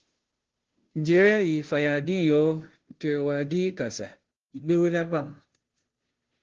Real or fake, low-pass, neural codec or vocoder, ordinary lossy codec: fake; 7.2 kHz; codec, 16 kHz, 0.5 kbps, FunCodec, trained on Chinese and English, 25 frames a second; Opus, 16 kbps